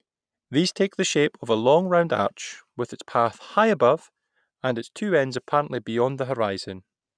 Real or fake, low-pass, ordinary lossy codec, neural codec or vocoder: real; 9.9 kHz; none; none